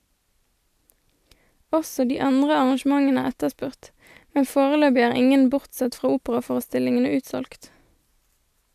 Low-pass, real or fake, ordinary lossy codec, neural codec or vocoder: 14.4 kHz; real; AAC, 96 kbps; none